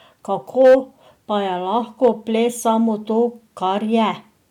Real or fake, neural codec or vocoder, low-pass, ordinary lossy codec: real; none; 19.8 kHz; none